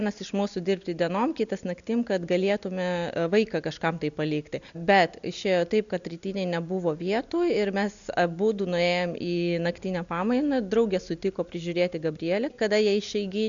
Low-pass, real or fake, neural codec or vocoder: 7.2 kHz; real; none